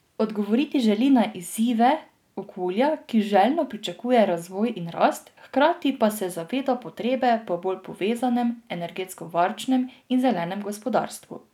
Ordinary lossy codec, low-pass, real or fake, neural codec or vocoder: none; 19.8 kHz; real; none